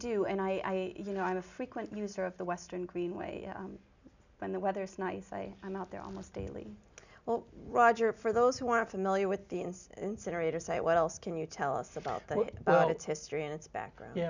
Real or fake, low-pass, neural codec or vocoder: real; 7.2 kHz; none